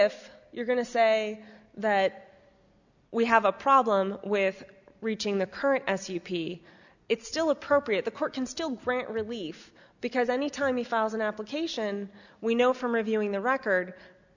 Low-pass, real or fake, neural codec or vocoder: 7.2 kHz; real; none